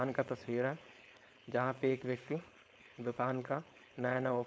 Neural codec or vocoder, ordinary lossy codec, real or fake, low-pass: codec, 16 kHz, 4.8 kbps, FACodec; none; fake; none